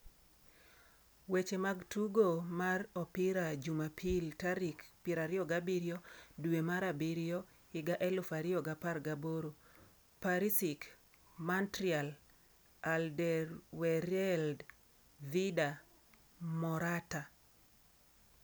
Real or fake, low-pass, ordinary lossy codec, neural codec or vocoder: real; none; none; none